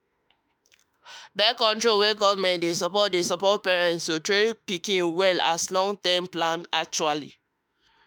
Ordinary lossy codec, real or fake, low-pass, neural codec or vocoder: none; fake; none; autoencoder, 48 kHz, 32 numbers a frame, DAC-VAE, trained on Japanese speech